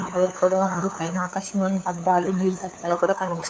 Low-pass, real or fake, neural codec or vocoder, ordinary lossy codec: none; fake; codec, 16 kHz, 2 kbps, FunCodec, trained on LibriTTS, 25 frames a second; none